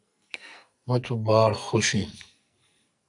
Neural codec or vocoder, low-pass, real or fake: codec, 44.1 kHz, 2.6 kbps, SNAC; 10.8 kHz; fake